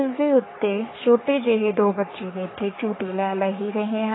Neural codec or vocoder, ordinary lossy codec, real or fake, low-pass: codec, 44.1 kHz, 3.4 kbps, Pupu-Codec; AAC, 16 kbps; fake; 7.2 kHz